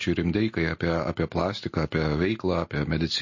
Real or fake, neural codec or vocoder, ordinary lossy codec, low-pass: real; none; MP3, 32 kbps; 7.2 kHz